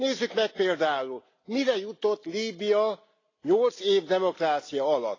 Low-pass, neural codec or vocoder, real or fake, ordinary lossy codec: 7.2 kHz; none; real; AAC, 32 kbps